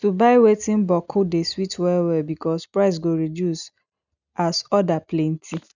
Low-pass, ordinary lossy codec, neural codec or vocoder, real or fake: 7.2 kHz; none; none; real